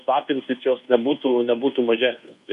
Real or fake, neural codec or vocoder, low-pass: fake; codec, 24 kHz, 1.2 kbps, DualCodec; 10.8 kHz